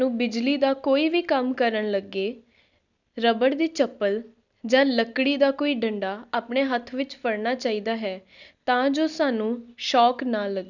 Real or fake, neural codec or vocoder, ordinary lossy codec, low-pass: real; none; none; 7.2 kHz